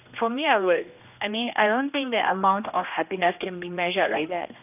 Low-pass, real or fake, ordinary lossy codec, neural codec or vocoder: 3.6 kHz; fake; none; codec, 16 kHz, 1 kbps, X-Codec, HuBERT features, trained on general audio